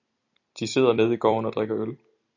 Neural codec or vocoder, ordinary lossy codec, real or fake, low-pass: vocoder, 44.1 kHz, 128 mel bands every 256 samples, BigVGAN v2; AAC, 48 kbps; fake; 7.2 kHz